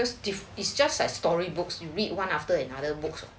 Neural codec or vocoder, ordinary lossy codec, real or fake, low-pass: none; none; real; none